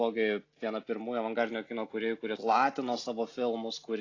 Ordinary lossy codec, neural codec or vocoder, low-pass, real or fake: AAC, 32 kbps; none; 7.2 kHz; real